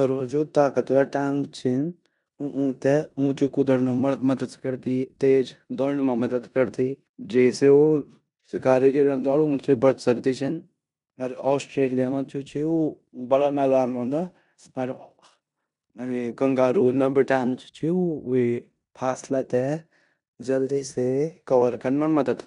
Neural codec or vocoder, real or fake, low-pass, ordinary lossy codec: codec, 16 kHz in and 24 kHz out, 0.9 kbps, LongCat-Audio-Codec, four codebook decoder; fake; 10.8 kHz; none